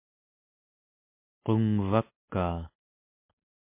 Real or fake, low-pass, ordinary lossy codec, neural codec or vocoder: real; 3.6 kHz; MP3, 24 kbps; none